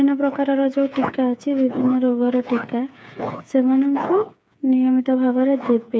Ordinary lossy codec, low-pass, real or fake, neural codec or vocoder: none; none; fake; codec, 16 kHz, 8 kbps, FreqCodec, smaller model